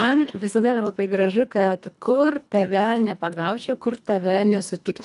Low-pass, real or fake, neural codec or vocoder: 10.8 kHz; fake; codec, 24 kHz, 1.5 kbps, HILCodec